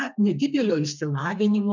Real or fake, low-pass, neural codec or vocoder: fake; 7.2 kHz; codec, 32 kHz, 1.9 kbps, SNAC